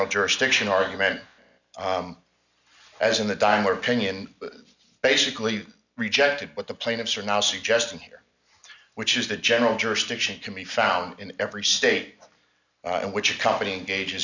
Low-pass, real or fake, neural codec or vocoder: 7.2 kHz; real; none